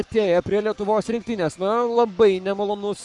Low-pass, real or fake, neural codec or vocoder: 10.8 kHz; fake; codec, 44.1 kHz, 7.8 kbps, Pupu-Codec